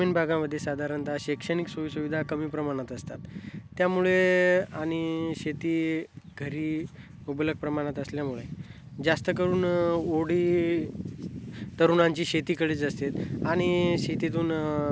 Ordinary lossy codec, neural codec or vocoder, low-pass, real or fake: none; none; none; real